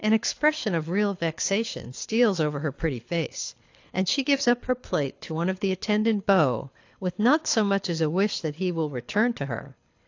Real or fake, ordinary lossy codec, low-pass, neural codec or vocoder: fake; AAC, 48 kbps; 7.2 kHz; codec, 24 kHz, 6 kbps, HILCodec